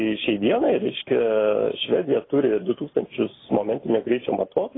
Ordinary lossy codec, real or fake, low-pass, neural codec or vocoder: AAC, 16 kbps; real; 7.2 kHz; none